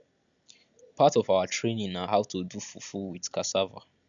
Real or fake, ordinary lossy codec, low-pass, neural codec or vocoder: real; none; 7.2 kHz; none